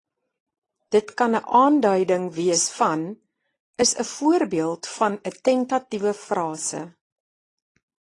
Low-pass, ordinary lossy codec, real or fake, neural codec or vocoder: 10.8 kHz; AAC, 32 kbps; real; none